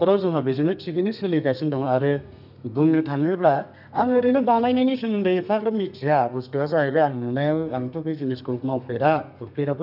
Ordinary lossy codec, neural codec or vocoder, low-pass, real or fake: none; codec, 32 kHz, 1.9 kbps, SNAC; 5.4 kHz; fake